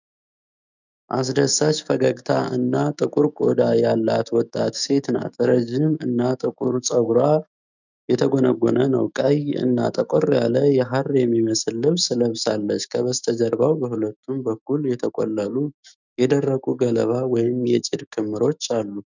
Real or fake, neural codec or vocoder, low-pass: fake; autoencoder, 48 kHz, 128 numbers a frame, DAC-VAE, trained on Japanese speech; 7.2 kHz